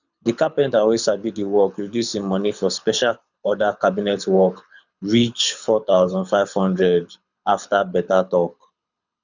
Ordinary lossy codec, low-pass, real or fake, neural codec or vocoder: none; 7.2 kHz; fake; codec, 24 kHz, 6 kbps, HILCodec